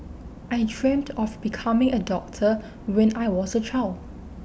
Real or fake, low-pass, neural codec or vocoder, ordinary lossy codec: real; none; none; none